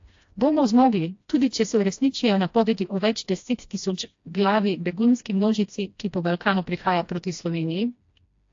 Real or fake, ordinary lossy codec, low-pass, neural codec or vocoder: fake; AAC, 48 kbps; 7.2 kHz; codec, 16 kHz, 1 kbps, FreqCodec, smaller model